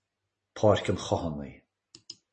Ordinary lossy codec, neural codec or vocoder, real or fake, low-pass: MP3, 32 kbps; none; real; 10.8 kHz